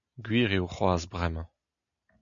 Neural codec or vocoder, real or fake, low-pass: none; real; 7.2 kHz